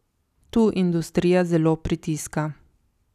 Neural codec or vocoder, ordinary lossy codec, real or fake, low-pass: none; none; real; 14.4 kHz